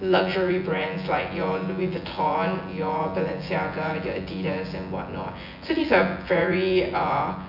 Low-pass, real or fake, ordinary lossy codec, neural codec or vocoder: 5.4 kHz; fake; none; vocoder, 24 kHz, 100 mel bands, Vocos